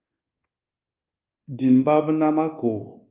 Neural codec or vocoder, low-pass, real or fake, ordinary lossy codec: codec, 24 kHz, 1.2 kbps, DualCodec; 3.6 kHz; fake; Opus, 32 kbps